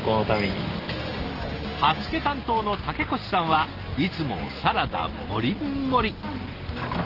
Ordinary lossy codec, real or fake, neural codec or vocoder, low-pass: Opus, 16 kbps; real; none; 5.4 kHz